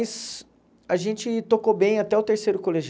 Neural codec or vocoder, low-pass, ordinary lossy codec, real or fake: none; none; none; real